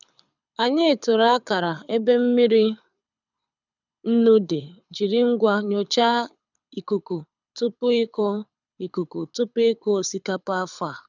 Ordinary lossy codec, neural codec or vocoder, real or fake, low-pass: none; codec, 24 kHz, 6 kbps, HILCodec; fake; 7.2 kHz